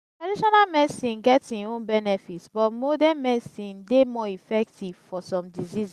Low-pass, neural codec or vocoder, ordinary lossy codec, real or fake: 14.4 kHz; none; Opus, 64 kbps; real